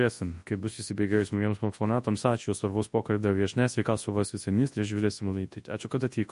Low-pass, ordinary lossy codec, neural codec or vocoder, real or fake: 10.8 kHz; MP3, 48 kbps; codec, 24 kHz, 0.9 kbps, WavTokenizer, large speech release; fake